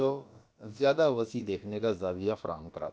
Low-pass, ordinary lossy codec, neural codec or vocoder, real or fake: none; none; codec, 16 kHz, about 1 kbps, DyCAST, with the encoder's durations; fake